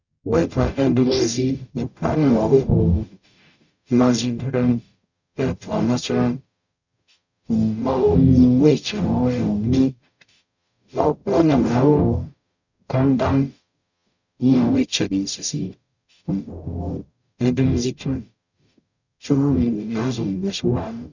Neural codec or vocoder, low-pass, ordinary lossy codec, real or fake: codec, 44.1 kHz, 0.9 kbps, DAC; 7.2 kHz; none; fake